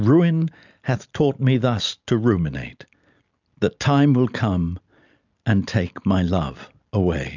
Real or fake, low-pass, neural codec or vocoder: real; 7.2 kHz; none